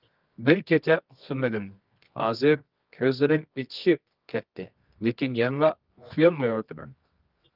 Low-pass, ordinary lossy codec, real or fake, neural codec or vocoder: 5.4 kHz; Opus, 32 kbps; fake; codec, 24 kHz, 0.9 kbps, WavTokenizer, medium music audio release